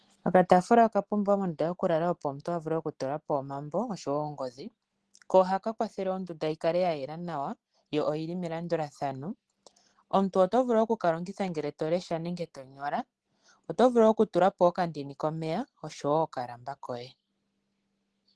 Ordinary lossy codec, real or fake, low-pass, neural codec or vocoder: Opus, 16 kbps; real; 10.8 kHz; none